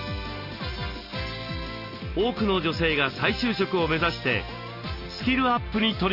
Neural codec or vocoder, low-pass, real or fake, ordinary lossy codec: none; 5.4 kHz; real; Opus, 64 kbps